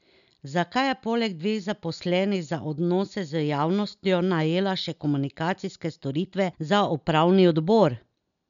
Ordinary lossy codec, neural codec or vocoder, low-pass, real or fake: MP3, 96 kbps; none; 7.2 kHz; real